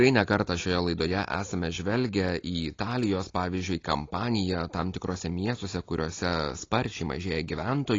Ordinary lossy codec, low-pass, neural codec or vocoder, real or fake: AAC, 32 kbps; 7.2 kHz; none; real